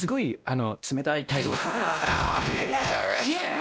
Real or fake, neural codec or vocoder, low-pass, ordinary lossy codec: fake; codec, 16 kHz, 1 kbps, X-Codec, WavLM features, trained on Multilingual LibriSpeech; none; none